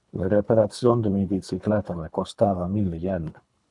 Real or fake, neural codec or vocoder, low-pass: fake; codec, 24 kHz, 3 kbps, HILCodec; 10.8 kHz